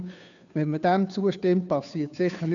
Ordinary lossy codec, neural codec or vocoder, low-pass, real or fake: none; codec, 16 kHz, 2 kbps, FunCodec, trained on Chinese and English, 25 frames a second; 7.2 kHz; fake